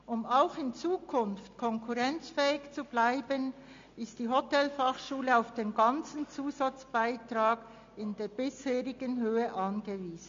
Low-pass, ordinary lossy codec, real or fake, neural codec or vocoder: 7.2 kHz; MP3, 48 kbps; real; none